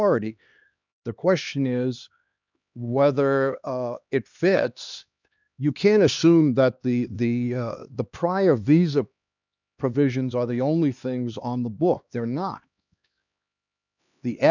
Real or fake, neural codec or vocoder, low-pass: fake; codec, 16 kHz, 2 kbps, X-Codec, HuBERT features, trained on LibriSpeech; 7.2 kHz